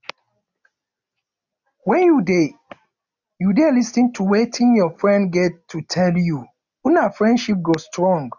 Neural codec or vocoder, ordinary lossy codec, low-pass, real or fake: none; none; 7.2 kHz; real